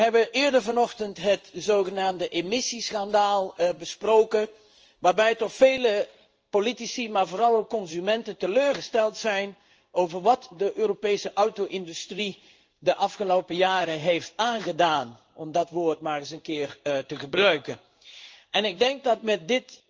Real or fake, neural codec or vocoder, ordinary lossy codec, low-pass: fake; codec, 16 kHz in and 24 kHz out, 1 kbps, XY-Tokenizer; Opus, 24 kbps; 7.2 kHz